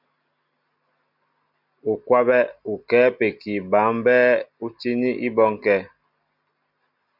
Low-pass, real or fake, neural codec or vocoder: 5.4 kHz; real; none